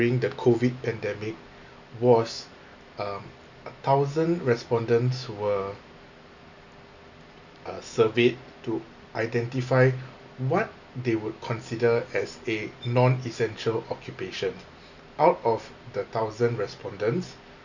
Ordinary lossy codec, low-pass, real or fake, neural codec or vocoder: none; 7.2 kHz; real; none